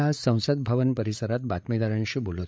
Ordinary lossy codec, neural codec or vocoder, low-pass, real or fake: none; codec, 16 kHz, 8 kbps, FreqCodec, larger model; none; fake